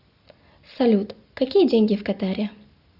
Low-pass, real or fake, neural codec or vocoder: 5.4 kHz; real; none